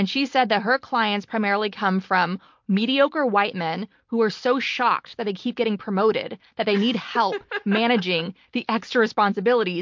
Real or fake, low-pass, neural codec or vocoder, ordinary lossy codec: real; 7.2 kHz; none; MP3, 48 kbps